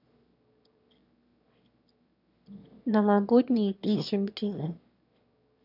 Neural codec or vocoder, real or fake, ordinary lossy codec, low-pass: autoencoder, 22.05 kHz, a latent of 192 numbers a frame, VITS, trained on one speaker; fake; none; 5.4 kHz